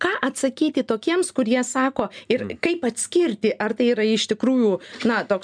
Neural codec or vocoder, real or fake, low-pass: none; real; 9.9 kHz